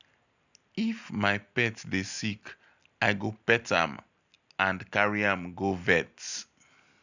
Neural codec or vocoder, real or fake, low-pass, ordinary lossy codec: none; real; 7.2 kHz; none